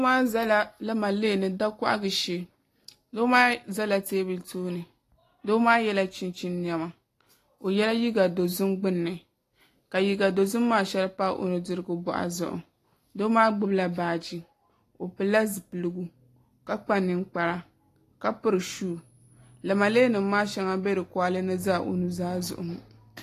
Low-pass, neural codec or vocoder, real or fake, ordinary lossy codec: 14.4 kHz; none; real; AAC, 48 kbps